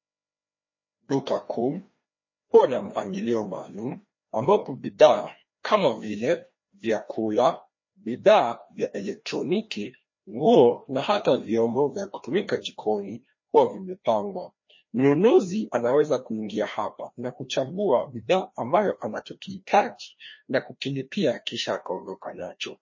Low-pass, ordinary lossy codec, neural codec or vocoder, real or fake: 7.2 kHz; MP3, 32 kbps; codec, 16 kHz, 1 kbps, FreqCodec, larger model; fake